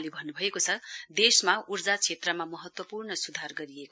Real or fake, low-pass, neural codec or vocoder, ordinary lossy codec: real; none; none; none